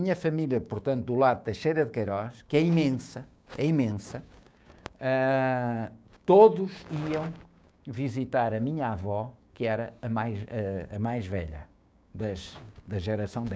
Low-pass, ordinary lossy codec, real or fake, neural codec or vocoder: none; none; fake; codec, 16 kHz, 6 kbps, DAC